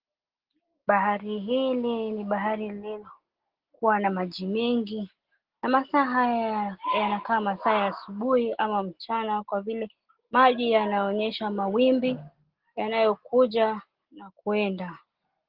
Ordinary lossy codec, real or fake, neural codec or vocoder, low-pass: Opus, 16 kbps; real; none; 5.4 kHz